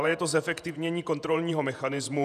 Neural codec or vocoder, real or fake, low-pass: vocoder, 48 kHz, 128 mel bands, Vocos; fake; 14.4 kHz